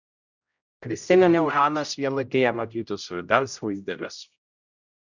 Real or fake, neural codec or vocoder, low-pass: fake; codec, 16 kHz, 0.5 kbps, X-Codec, HuBERT features, trained on general audio; 7.2 kHz